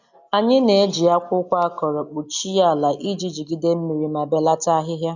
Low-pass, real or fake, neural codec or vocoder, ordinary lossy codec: 7.2 kHz; real; none; none